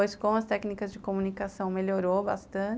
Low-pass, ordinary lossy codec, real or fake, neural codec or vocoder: none; none; real; none